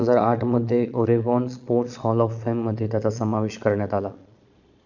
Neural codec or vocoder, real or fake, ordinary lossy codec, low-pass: vocoder, 22.05 kHz, 80 mel bands, Vocos; fake; none; 7.2 kHz